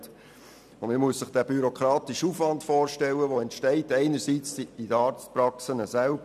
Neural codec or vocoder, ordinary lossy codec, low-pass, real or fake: none; none; 14.4 kHz; real